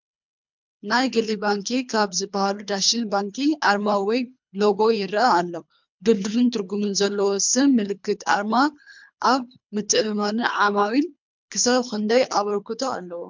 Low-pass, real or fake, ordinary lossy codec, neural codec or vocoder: 7.2 kHz; fake; MP3, 64 kbps; codec, 24 kHz, 3 kbps, HILCodec